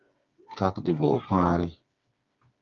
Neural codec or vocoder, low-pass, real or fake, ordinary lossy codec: codec, 16 kHz, 4 kbps, FreqCodec, smaller model; 7.2 kHz; fake; Opus, 32 kbps